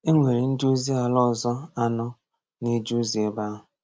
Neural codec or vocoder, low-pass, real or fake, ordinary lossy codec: none; none; real; none